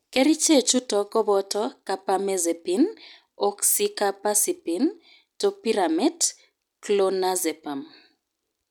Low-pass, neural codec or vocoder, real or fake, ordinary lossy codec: 19.8 kHz; none; real; none